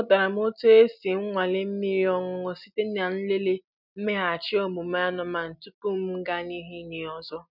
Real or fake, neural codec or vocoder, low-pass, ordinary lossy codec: real; none; 5.4 kHz; none